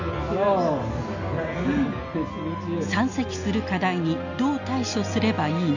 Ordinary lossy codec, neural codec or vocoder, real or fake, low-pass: none; none; real; 7.2 kHz